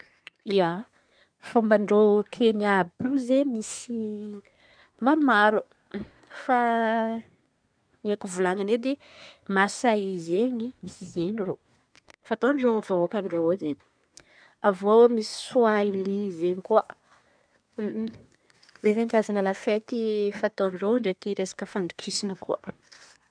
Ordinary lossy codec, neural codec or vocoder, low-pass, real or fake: none; codec, 24 kHz, 1 kbps, SNAC; 9.9 kHz; fake